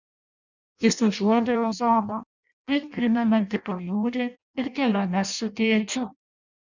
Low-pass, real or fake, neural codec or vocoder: 7.2 kHz; fake; codec, 16 kHz in and 24 kHz out, 0.6 kbps, FireRedTTS-2 codec